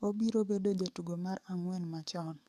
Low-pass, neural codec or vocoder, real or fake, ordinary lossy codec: 14.4 kHz; codec, 44.1 kHz, 7.8 kbps, DAC; fake; AAC, 96 kbps